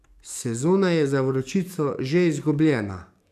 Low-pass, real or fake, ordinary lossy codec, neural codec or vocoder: 14.4 kHz; fake; none; codec, 44.1 kHz, 7.8 kbps, DAC